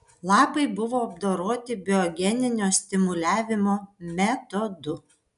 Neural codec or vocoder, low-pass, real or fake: none; 10.8 kHz; real